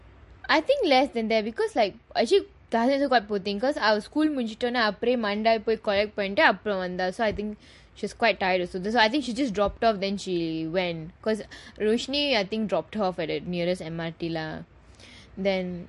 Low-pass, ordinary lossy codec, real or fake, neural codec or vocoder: 14.4 kHz; MP3, 48 kbps; real; none